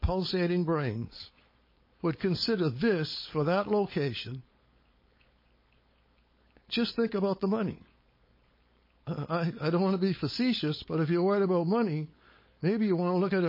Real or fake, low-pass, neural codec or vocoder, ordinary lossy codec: fake; 5.4 kHz; codec, 16 kHz, 4.8 kbps, FACodec; MP3, 24 kbps